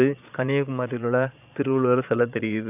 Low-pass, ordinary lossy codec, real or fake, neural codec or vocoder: 3.6 kHz; none; fake; vocoder, 22.05 kHz, 80 mel bands, Vocos